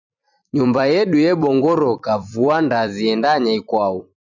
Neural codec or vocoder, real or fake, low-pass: vocoder, 44.1 kHz, 128 mel bands every 256 samples, BigVGAN v2; fake; 7.2 kHz